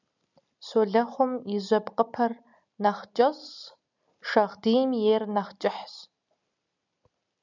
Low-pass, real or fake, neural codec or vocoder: 7.2 kHz; real; none